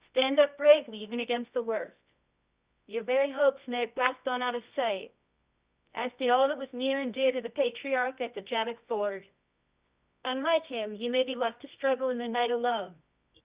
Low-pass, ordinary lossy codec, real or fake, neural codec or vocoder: 3.6 kHz; Opus, 64 kbps; fake; codec, 24 kHz, 0.9 kbps, WavTokenizer, medium music audio release